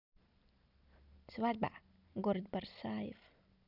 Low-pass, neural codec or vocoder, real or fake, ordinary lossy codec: 5.4 kHz; none; real; none